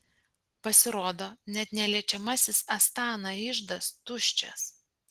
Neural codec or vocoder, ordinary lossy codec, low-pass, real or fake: none; Opus, 16 kbps; 14.4 kHz; real